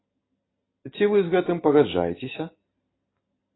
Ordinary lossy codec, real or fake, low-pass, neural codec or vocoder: AAC, 16 kbps; real; 7.2 kHz; none